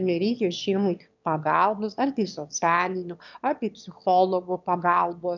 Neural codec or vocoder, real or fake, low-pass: autoencoder, 22.05 kHz, a latent of 192 numbers a frame, VITS, trained on one speaker; fake; 7.2 kHz